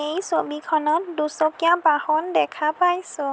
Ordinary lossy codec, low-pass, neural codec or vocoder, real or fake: none; none; none; real